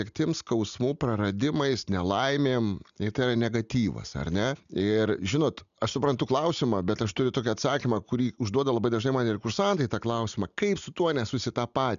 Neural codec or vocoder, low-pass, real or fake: none; 7.2 kHz; real